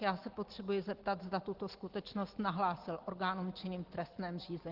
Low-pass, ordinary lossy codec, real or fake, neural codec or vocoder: 5.4 kHz; Opus, 16 kbps; real; none